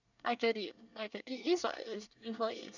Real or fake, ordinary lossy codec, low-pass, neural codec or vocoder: fake; none; 7.2 kHz; codec, 24 kHz, 1 kbps, SNAC